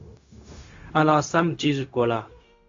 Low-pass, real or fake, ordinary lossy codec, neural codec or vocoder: 7.2 kHz; fake; AAC, 48 kbps; codec, 16 kHz, 0.4 kbps, LongCat-Audio-Codec